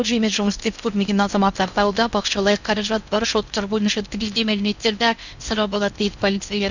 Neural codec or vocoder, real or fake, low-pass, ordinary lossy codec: codec, 16 kHz in and 24 kHz out, 0.6 kbps, FocalCodec, streaming, 2048 codes; fake; 7.2 kHz; none